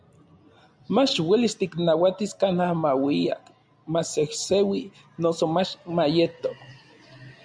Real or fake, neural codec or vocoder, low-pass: real; none; 9.9 kHz